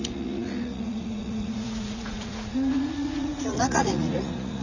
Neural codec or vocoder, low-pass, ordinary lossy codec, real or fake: vocoder, 44.1 kHz, 80 mel bands, Vocos; 7.2 kHz; none; fake